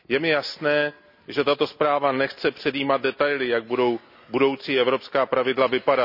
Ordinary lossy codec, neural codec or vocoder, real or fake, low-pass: none; none; real; 5.4 kHz